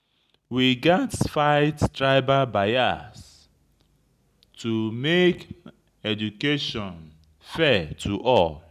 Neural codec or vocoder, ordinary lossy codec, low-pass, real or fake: none; none; 14.4 kHz; real